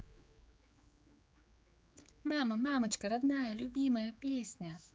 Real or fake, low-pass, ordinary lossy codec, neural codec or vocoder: fake; none; none; codec, 16 kHz, 4 kbps, X-Codec, HuBERT features, trained on general audio